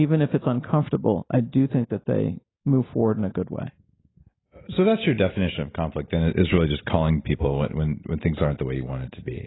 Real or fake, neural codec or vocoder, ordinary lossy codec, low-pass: real; none; AAC, 16 kbps; 7.2 kHz